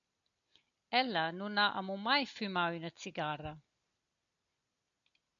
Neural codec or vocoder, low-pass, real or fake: none; 7.2 kHz; real